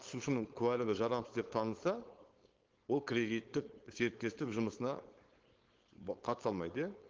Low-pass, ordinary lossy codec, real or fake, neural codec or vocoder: 7.2 kHz; Opus, 16 kbps; fake; codec, 16 kHz, 4.8 kbps, FACodec